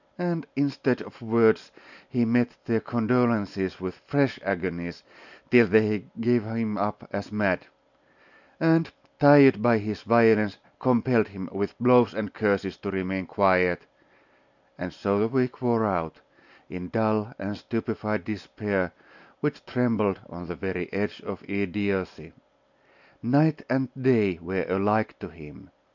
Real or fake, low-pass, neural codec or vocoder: real; 7.2 kHz; none